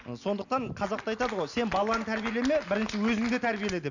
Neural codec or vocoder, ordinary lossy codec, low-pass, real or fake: none; none; 7.2 kHz; real